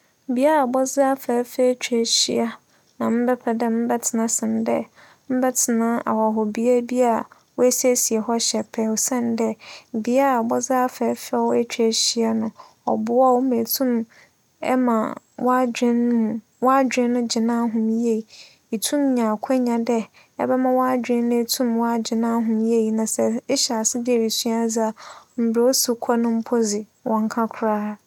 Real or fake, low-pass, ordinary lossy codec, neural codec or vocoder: real; 19.8 kHz; none; none